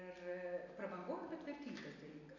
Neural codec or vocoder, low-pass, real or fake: none; 7.2 kHz; real